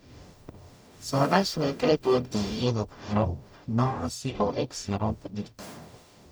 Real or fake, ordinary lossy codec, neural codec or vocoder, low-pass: fake; none; codec, 44.1 kHz, 0.9 kbps, DAC; none